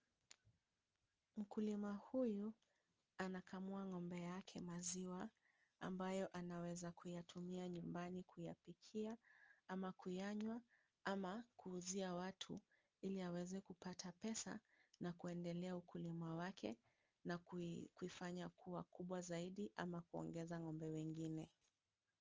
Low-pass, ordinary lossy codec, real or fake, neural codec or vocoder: 7.2 kHz; Opus, 24 kbps; real; none